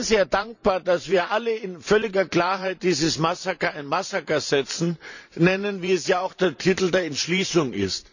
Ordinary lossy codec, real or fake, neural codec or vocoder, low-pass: none; fake; vocoder, 44.1 kHz, 128 mel bands every 512 samples, BigVGAN v2; 7.2 kHz